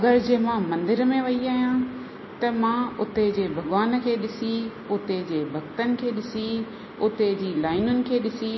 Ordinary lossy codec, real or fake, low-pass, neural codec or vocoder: MP3, 24 kbps; real; 7.2 kHz; none